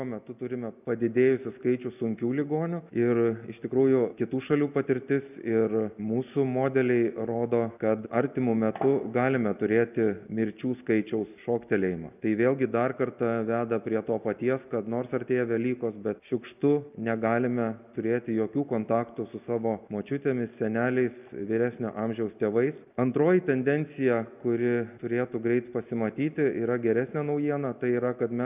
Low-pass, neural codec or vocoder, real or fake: 3.6 kHz; none; real